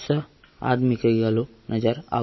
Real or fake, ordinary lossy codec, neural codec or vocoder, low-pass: real; MP3, 24 kbps; none; 7.2 kHz